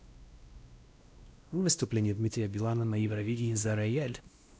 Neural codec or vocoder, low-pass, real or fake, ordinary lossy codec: codec, 16 kHz, 1 kbps, X-Codec, WavLM features, trained on Multilingual LibriSpeech; none; fake; none